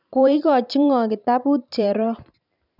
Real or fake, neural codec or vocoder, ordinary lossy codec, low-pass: fake; vocoder, 44.1 kHz, 128 mel bands every 512 samples, BigVGAN v2; none; 5.4 kHz